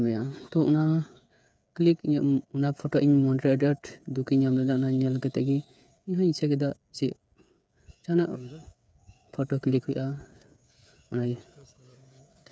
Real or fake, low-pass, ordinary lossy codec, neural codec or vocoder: fake; none; none; codec, 16 kHz, 8 kbps, FreqCodec, smaller model